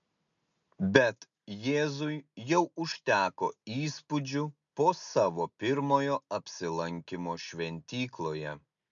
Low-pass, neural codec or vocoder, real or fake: 7.2 kHz; none; real